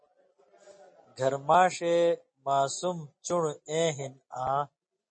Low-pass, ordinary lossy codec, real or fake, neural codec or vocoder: 9.9 kHz; MP3, 32 kbps; real; none